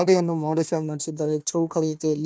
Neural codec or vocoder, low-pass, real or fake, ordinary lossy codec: codec, 16 kHz, 1 kbps, FunCodec, trained on Chinese and English, 50 frames a second; none; fake; none